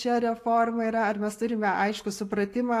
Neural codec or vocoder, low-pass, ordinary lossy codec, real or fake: none; 14.4 kHz; AAC, 64 kbps; real